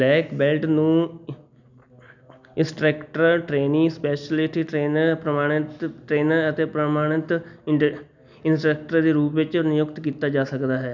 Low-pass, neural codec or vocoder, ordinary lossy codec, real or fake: 7.2 kHz; none; none; real